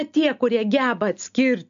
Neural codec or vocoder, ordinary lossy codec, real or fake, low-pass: none; MP3, 64 kbps; real; 7.2 kHz